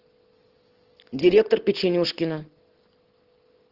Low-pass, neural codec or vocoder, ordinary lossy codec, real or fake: 5.4 kHz; none; Opus, 16 kbps; real